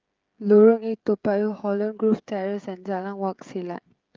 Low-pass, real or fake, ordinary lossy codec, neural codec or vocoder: 7.2 kHz; fake; Opus, 24 kbps; codec, 16 kHz, 16 kbps, FreqCodec, smaller model